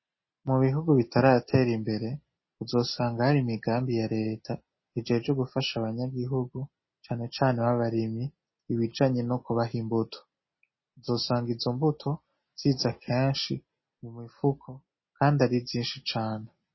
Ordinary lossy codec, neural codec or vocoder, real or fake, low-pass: MP3, 24 kbps; none; real; 7.2 kHz